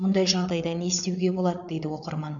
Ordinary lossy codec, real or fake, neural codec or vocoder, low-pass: MP3, 64 kbps; fake; codec, 16 kHz, 8 kbps, FreqCodec, larger model; 7.2 kHz